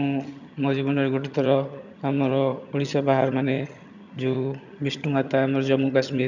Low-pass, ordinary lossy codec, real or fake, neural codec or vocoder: 7.2 kHz; none; fake; vocoder, 22.05 kHz, 80 mel bands, HiFi-GAN